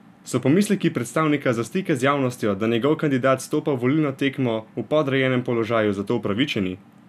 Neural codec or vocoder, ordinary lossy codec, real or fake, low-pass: none; none; real; 14.4 kHz